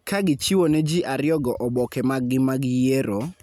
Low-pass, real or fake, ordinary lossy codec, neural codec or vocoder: 19.8 kHz; real; none; none